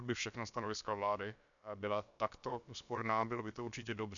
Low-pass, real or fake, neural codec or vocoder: 7.2 kHz; fake; codec, 16 kHz, about 1 kbps, DyCAST, with the encoder's durations